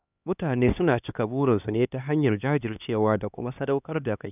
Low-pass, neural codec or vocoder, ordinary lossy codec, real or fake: 3.6 kHz; codec, 16 kHz, 2 kbps, X-Codec, HuBERT features, trained on LibriSpeech; none; fake